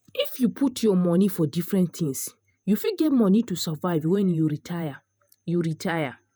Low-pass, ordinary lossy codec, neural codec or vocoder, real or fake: none; none; vocoder, 48 kHz, 128 mel bands, Vocos; fake